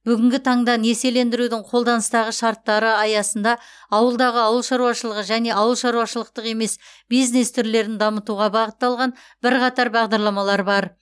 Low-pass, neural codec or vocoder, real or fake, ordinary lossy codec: none; none; real; none